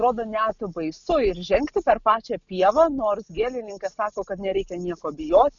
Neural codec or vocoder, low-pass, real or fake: none; 7.2 kHz; real